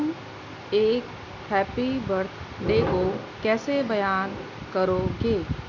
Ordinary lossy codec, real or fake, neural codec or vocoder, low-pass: none; real; none; 7.2 kHz